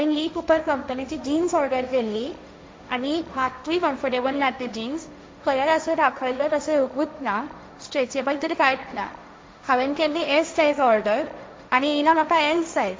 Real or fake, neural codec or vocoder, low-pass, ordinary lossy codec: fake; codec, 16 kHz, 1.1 kbps, Voila-Tokenizer; none; none